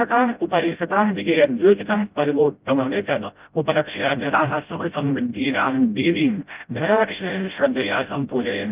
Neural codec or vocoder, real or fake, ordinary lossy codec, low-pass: codec, 16 kHz, 0.5 kbps, FreqCodec, smaller model; fake; Opus, 24 kbps; 3.6 kHz